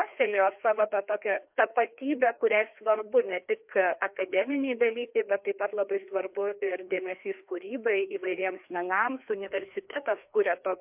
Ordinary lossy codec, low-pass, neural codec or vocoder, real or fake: MP3, 32 kbps; 3.6 kHz; codec, 16 kHz, 2 kbps, FreqCodec, larger model; fake